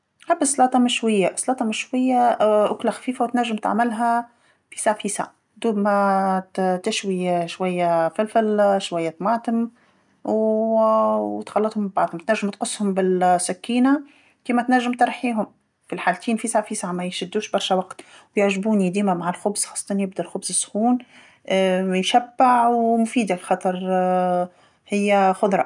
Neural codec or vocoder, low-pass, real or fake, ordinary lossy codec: none; 10.8 kHz; real; none